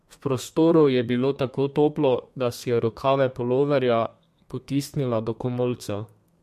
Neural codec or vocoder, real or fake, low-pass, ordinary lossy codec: codec, 32 kHz, 1.9 kbps, SNAC; fake; 14.4 kHz; MP3, 64 kbps